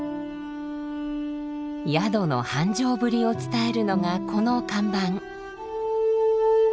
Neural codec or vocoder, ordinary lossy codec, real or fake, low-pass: none; none; real; none